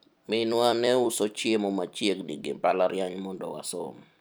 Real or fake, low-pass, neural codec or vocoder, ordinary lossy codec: fake; none; vocoder, 44.1 kHz, 128 mel bands every 256 samples, BigVGAN v2; none